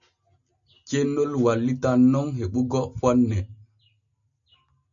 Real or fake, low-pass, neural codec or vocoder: real; 7.2 kHz; none